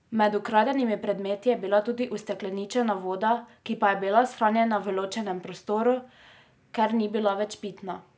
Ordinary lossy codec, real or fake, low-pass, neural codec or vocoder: none; real; none; none